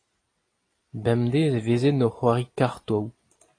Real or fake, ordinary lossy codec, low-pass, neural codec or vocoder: real; AAC, 32 kbps; 9.9 kHz; none